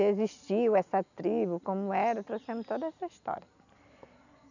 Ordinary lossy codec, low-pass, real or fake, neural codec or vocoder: none; 7.2 kHz; real; none